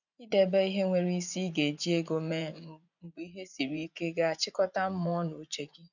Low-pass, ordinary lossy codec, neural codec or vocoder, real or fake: 7.2 kHz; none; vocoder, 24 kHz, 100 mel bands, Vocos; fake